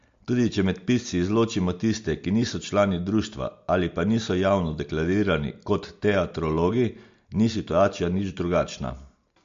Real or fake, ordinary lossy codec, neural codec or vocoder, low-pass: real; MP3, 48 kbps; none; 7.2 kHz